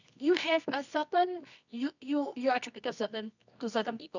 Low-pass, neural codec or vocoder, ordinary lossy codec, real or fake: 7.2 kHz; codec, 24 kHz, 0.9 kbps, WavTokenizer, medium music audio release; none; fake